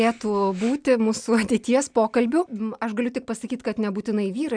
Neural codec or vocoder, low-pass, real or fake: none; 9.9 kHz; real